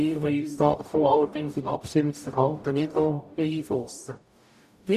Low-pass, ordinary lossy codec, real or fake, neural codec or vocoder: 14.4 kHz; none; fake; codec, 44.1 kHz, 0.9 kbps, DAC